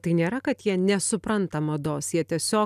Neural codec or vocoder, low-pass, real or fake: none; 14.4 kHz; real